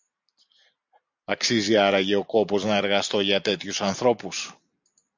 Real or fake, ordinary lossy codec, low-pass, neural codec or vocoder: real; MP3, 64 kbps; 7.2 kHz; none